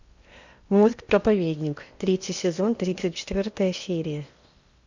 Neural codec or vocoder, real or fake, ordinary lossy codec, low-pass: codec, 16 kHz in and 24 kHz out, 0.8 kbps, FocalCodec, streaming, 65536 codes; fake; Opus, 64 kbps; 7.2 kHz